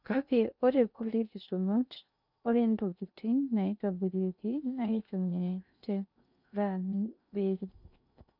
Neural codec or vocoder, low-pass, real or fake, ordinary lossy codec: codec, 16 kHz in and 24 kHz out, 0.6 kbps, FocalCodec, streaming, 2048 codes; 5.4 kHz; fake; none